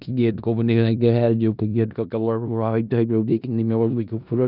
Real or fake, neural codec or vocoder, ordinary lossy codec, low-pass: fake; codec, 16 kHz in and 24 kHz out, 0.4 kbps, LongCat-Audio-Codec, four codebook decoder; none; 5.4 kHz